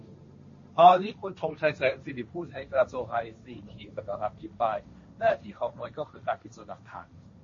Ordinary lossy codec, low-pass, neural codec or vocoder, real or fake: MP3, 32 kbps; 7.2 kHz; codec, 16 kHz, 1.1 kbps, Voila-Tokenizer; fake